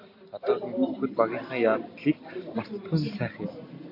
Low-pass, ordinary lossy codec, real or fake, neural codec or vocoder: 5.4 kHz; MP3, 32 kbps; real; none